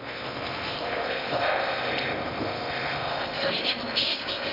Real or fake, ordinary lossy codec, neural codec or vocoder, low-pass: fake; none; codec, 16 kHz in and 24 kHz out, 0.6 kbps, FocalCodec, streaming, 2048 codes; 5.4 kHz